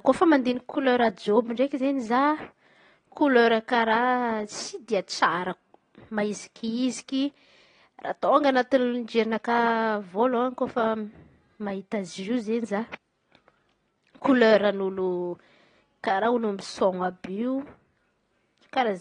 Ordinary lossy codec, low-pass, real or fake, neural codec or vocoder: AAC, 32 kbps; 9.9 kHz; real; none